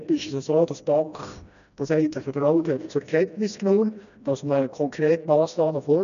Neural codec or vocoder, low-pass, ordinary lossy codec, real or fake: codec, 16 kHz, 1 kbps, FreqCodec, smaller model; 7.2 kHz; none; fake